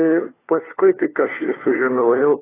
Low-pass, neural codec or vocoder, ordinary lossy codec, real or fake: 3.6 kHz; codec, 16 kHz, 4 kbps, FunCodec, trained on LibriTTS, 50 frames a second; AAC, 16 kbps; fake